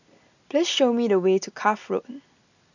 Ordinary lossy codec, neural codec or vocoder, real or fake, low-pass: none; none; real; 7.2 kHz